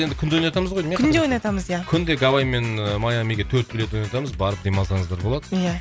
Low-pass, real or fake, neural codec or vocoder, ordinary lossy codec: none; real; none; none